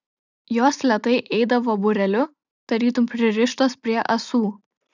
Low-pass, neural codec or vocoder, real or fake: 7.2 kHz; none; real